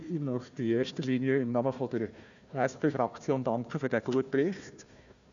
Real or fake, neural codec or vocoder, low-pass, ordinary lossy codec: fake; codec, 16 kHz, 1 kbps, FunCodec, trained on Chinese and English, 50 frames a second; 7.2 kHz; none